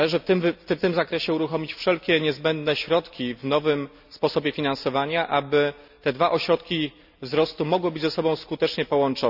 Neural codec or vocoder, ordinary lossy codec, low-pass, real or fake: none; none; 5.4 kHz; real